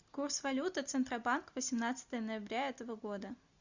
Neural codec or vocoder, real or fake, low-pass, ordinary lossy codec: none; real; 7.2 kHz; Opus, 64 kbps